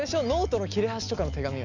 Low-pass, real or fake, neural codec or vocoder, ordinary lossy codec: 7.2 kHz; real; none; none